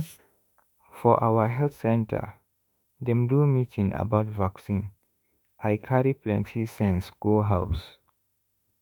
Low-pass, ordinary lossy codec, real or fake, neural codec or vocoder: none; none; fake; autoencoder, 48 kHz, 32 numbers a frame, DAC-VAE, trained on Japanese speech